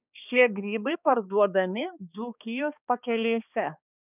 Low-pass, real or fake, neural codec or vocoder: 3.6 kHz; fake; codec, 16 kHz, 2 kbps, X-Codec, HuBERT features, trained on balanced general audio